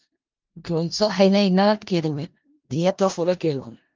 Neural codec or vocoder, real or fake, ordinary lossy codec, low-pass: codec, 16 kHz in and 24 kHz out, 0.4 kbps, LongCat-Audio-Codec, four codebook decoder; fake; Opus, 24 kbps; 7.2 kHz